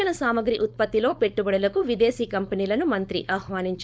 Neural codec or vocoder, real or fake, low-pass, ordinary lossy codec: codec, 16 kHz, 4.8 kbps, FACodec; fake; none; none